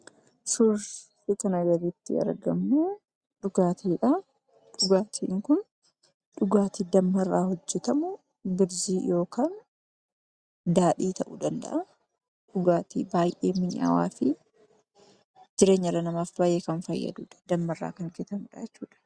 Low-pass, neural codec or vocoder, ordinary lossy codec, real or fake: 9.9 kHz; none; Opus, 64 kbps; real